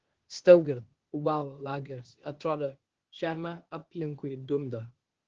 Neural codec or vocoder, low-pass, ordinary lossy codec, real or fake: codec, 16 kHz, 0.8 kbps, ZipCodec; 7.2 kHz; Opus, 16 kbps; fake